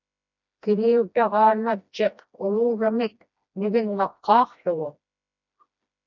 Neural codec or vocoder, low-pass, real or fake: codec, 16 kHz, 1 kbps, FreqCodec, smaller model; 7.2 kHz; fake